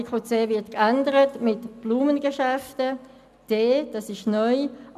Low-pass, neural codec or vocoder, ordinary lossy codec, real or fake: 14.4 kHz; none; none; real